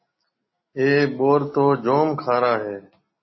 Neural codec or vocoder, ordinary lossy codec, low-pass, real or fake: none; MP3, 24 kbps; 7.2 kHz; real